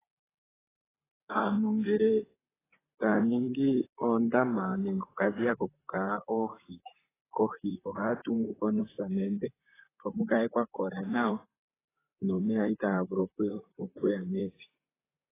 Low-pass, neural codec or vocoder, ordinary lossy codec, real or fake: 3.6 kHz; vocoder, 44.1 kHz, 128 mel bands, Pupu-Vocoder; AAC, 16 kbps; fake